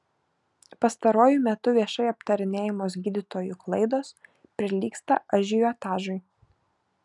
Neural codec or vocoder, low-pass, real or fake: none; 10.8 kHz; real